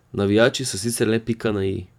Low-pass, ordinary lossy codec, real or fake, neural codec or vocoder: 19.8 kHz; none; fake; vocoder, 44.1 kHz, 128 mel bands every 512 samples, BigVGAN v2